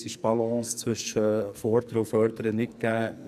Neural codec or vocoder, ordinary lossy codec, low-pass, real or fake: codec, 44.1 kHz, 2.6 kbps, SNAC; none; 14.4 kHz; fake